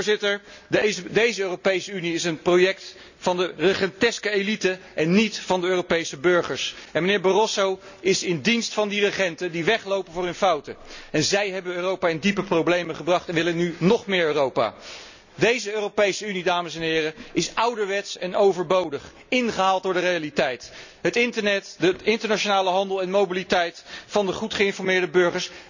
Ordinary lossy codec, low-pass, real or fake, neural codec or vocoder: none; 7.2 kHz; real; none